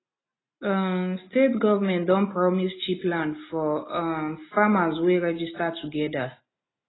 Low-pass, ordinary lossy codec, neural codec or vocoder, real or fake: 7.2 kHz; AAC, 16 kbps; none; real